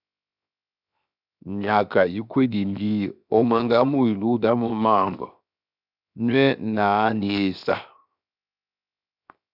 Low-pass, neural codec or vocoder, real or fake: 5.4 kHz; codec, 16 kHz, 0.7 kbps, FocalCodec; fake